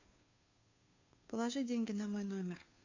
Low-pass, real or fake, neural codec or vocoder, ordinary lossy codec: 7.2 kHz; fake; codec, 16 kHz, 2 kbps, FunCodec, trained on Chinese and English, 25 frames a second; AAC, 48 kbps